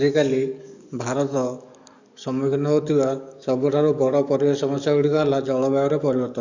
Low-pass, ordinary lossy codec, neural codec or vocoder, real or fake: 7.2 kHz; none; codec, 44.1 kHz, 7.8 kbps, DAC; fake